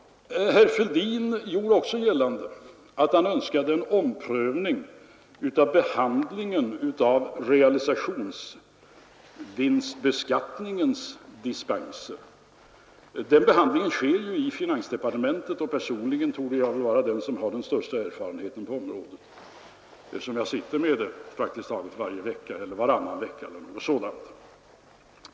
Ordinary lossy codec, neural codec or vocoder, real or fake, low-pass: none; none; real; none